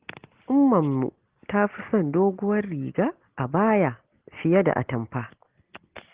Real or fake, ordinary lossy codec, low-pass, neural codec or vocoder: real; Opus, 16 kbps; 3.6 kHz; none